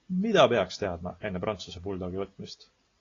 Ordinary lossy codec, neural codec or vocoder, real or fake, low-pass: AAC, 32 kbps; none; real; 7.2 kHz